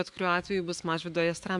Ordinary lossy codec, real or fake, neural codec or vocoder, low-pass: AAC, 64 kbps; fake; autoencoder, 48 kHz, 128 numbers a frame, DAC-VAE, trained on Japanese speech; 10.8 kHz